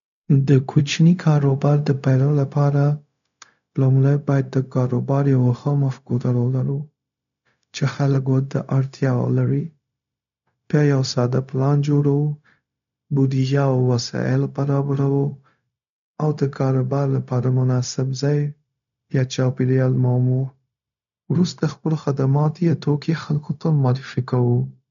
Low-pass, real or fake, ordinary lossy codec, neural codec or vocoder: 7.2 kHz; fake; none; codec, 16 kHz, 0.4 kbps, LongCat-Audio-Codec